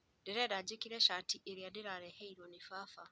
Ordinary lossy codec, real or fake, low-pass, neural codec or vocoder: none; real; none; none